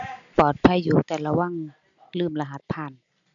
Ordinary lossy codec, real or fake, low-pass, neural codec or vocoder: none; real; 7.2 kHz; none